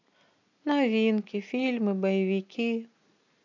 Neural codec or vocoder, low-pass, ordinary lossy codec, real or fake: none; 7.2 kHz; AAC, 48 kbps; real